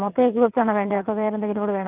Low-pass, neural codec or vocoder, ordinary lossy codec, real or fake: 3.6 kHz; vocoder, 22.05 kHz, 80 mel bands, WaveNeXt; Opus, 32 kbps; fake